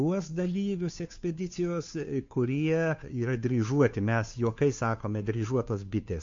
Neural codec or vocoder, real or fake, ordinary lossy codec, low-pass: codec, 16 kHz, 2 kbps, FunCodec, trained on Chinese and English, 25 frames a second; fake; MP3, 48 kbps; 7.2 kHz